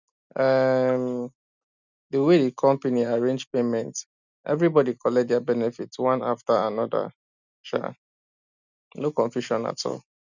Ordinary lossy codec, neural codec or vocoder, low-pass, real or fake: none; none; 7.2 kHz; real